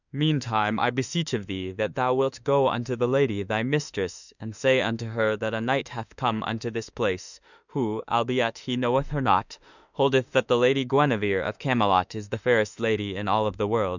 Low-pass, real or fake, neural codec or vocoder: 7.2 kHz; fake; autoencoder, 48 kHz, 32 numbers a frame, DAC-VAE, trained on Japanese speech